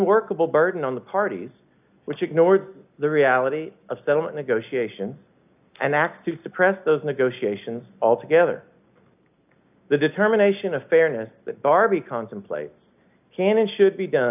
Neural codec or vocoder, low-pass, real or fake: none; 3.6 kHz; real